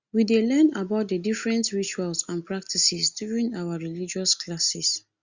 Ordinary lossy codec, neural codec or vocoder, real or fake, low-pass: Opus, 64 kbps; none; real; 7.2 kHz